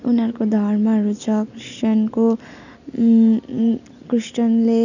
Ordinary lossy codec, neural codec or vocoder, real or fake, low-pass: none; none; real; 7.2 kHz